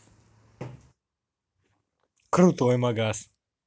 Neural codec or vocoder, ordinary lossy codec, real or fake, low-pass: none; none; real; none